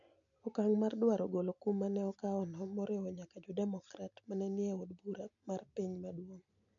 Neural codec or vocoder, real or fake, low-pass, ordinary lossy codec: none; real; 7.2 kHz; none